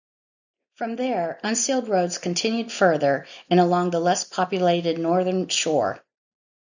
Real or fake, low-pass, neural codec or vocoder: real; 7.2 kHz; none